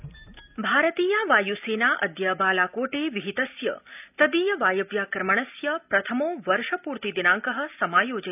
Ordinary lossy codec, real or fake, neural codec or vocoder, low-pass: none; real; none; 3.6 kHz